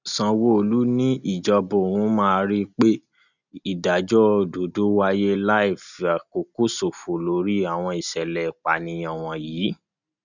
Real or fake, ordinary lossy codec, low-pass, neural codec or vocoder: real; none; 7.2 kHz; none